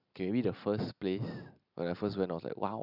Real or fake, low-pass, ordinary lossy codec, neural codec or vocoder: real; 5.4 kHz; none; none